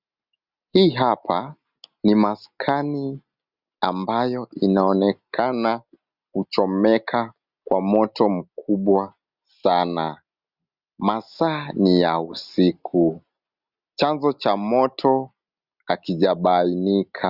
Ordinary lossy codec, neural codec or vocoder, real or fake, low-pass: Opus, 64 kbps; none; real; 5.4 kHz